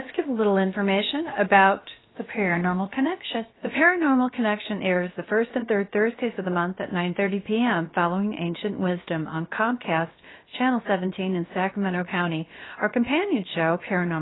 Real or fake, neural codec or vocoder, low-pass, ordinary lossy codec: fake; codec, 16 kHz, about 1 kbps, DyCAST, with the encoder's durations; 7.2 kHz; AAC, 16 kbps